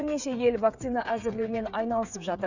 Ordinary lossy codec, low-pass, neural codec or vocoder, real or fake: none; 7.2 kHz; codec, 16 kHz, 8 kbps, FreqCodec, smaller model; fake